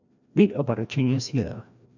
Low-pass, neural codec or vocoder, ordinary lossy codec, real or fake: 7.2 kHz; codec, 16 kHz, 1 kbps, FreqCodec, larger model; none; fake